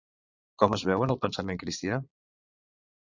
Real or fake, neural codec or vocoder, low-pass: fake; vocoder, 22.05 kHz, 80 mel bands, Vocos; 7.2 kHz